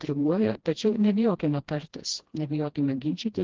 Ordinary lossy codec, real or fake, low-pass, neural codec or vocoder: Opus, 16 kbps; fake; 7.2 kHz; codec, 16 kHz, 1 kbps, FreqCodec, smaller model